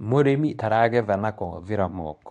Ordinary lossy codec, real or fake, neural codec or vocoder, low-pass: none; fake; codec, 24 kHz, 0.9 kbps, WavTokenizer, medium speech release version 2; 10.8 kHz